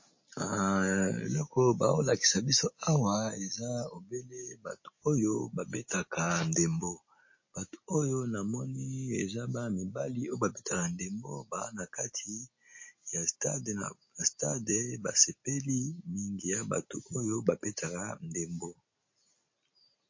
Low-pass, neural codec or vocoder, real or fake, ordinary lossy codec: 7.2 kHz; none; real; MP3, 32 kbps